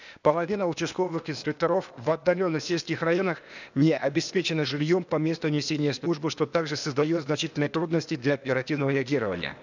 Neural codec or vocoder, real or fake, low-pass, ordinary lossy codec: codec, 16 kHz, 0.8 kbps, ZipCodec; fake; 7.2 kHz; none